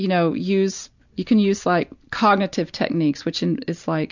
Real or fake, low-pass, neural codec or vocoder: real; 7.2 kHz; none